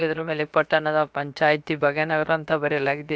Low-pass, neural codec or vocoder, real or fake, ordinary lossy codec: none; codec, 16 kHz, about 1 kbps, DyCAST, with the encoder's durations; fake; none